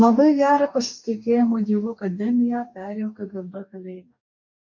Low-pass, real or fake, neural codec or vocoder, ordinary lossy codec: 7.2 kHz; fake; codec, 44.1 kHz, 2.6 kbps, DAC; MP3, 64 kbps